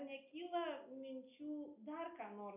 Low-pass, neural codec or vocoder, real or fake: 3.6 kHz; none; real